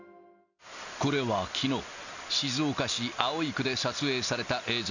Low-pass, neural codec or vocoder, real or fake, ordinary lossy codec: 7.2 kHz; none; real; none